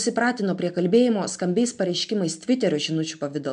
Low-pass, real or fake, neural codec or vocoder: 9.9 kHz; real; none